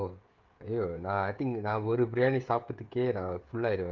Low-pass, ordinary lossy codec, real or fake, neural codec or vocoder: 7.2 kHz; Opus, 32 kbps; fake; codec, 16 kHz, 16 kbps, FreqCodec, smaller model